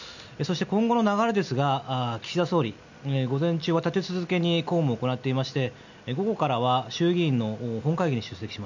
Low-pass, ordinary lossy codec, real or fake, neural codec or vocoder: 7.2 kHz; none; real; none